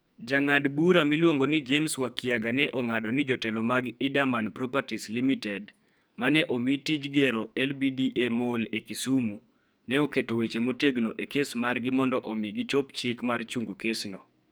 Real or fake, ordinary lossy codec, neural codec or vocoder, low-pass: fake; none; codec, 44.1 kHz, 2.6 kbps, SNAC; none